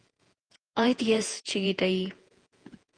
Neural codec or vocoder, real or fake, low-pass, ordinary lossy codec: vocoder, 48 kHz, 128 mel bands, Vocos; fake; 9.9 kHz; Opus, 24 kbps